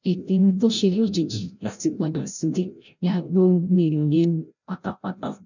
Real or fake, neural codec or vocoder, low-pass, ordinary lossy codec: fake; codec, 16 kHz, 0.5 kbps, FreqCodec, larger model; 7.2 kHz; none